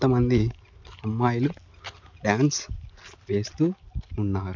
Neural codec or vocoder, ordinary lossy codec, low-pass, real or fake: none; MP3, 48 kbps; 7.2 kHz; real